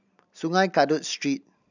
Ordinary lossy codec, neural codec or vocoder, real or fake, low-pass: none; none; real; 7.2 kHz